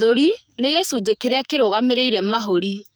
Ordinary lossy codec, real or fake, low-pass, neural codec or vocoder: none; fake; none; codec, 44.1 kHz, 2.6 kbps, SNAC